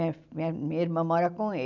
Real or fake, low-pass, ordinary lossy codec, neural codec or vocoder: real; 7.2 kHz; none; none